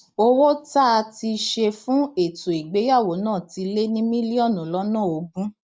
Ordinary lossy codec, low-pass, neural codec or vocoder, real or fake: none; none; none; real